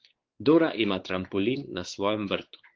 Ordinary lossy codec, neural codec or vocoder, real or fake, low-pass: Opus, 16 kbps; codec, 16 kHz, 4 kbps, X-Codec, WavLM features, trained on Multilingual LibriSpeech; fake; 7.2 kHz